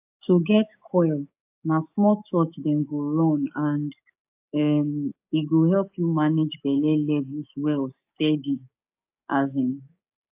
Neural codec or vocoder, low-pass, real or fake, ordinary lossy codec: codec, 16 kHz, 6 kbps, DAC; 3.6 kHz; fake; AAC, 32 kbps